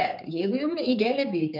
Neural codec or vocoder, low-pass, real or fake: codec, 16 kHz, 4 kbps, X-Codec, HuBERT features, trained on general audio; 5.4 kHz; fake